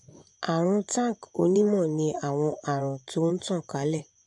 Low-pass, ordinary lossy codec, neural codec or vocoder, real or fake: 10.8 kHz; AAC, 64 kbps; vocoder, 24 kHz, 100 mel bands, Vocos; fake